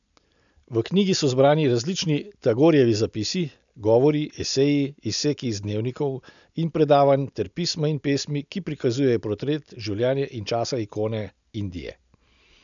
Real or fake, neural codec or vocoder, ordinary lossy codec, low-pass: real; none; none; 7.2 kHz